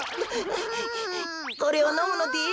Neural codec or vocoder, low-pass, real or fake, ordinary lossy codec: none; none; real; none